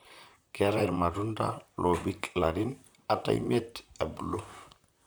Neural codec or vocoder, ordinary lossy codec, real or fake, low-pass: vocoder, 44.1 kHz, 128 mel bands, Pupu-Vocoder; none; fake; none